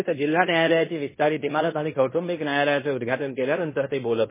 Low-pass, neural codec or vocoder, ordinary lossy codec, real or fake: 3.6 kHz; codec, 16 kHz in and 24 kHz out, 0.9 kbps, LongCat-Audio-Codec, fine tuned four codebook decoder; MP3, 16 kbps; fake